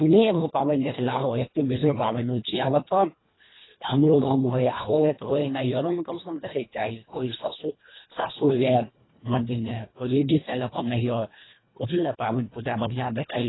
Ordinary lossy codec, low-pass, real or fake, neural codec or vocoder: AAC, 16 kbps; 7.2 kHz; fake; codec, 24 kHz, 1.5 kbps, HILCodec